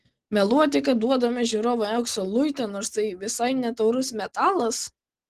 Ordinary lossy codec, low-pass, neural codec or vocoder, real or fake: Opus, 16 kbps; 14.4 kHz; none; real